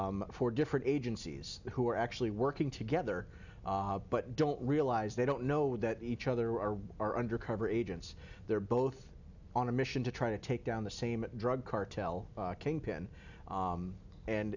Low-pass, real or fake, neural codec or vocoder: 7.2 kHz; real; none